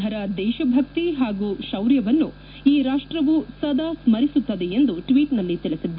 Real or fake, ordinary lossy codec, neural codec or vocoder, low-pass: real; AAC, 48 kbps; none; 5.4 kHz